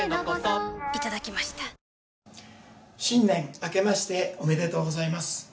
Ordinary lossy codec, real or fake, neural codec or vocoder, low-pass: none; real; none; none